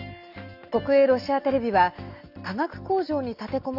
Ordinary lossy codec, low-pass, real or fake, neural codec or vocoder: none; 5.4 kHz; real; none